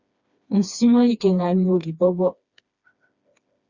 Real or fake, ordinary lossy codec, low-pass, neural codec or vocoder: fake; Opus, 64 kbps; 7.2 kHz; codec, 16 kHz, 2 kbps, FreqCodec, smaller model